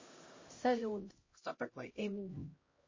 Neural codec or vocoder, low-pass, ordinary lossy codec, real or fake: codec, 16 kHz, 0.5 kbps, X-Codec, HuBERT features, trained on LibriSpeech; 7.2 kHz; MP3, 32 kbps; fake